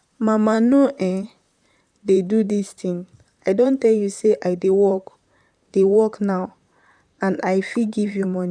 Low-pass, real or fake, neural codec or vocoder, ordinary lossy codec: 9.9 kHz; fake; vocoder, 44.1 kHz, 128 mel bands, Pupu-Vocoder; none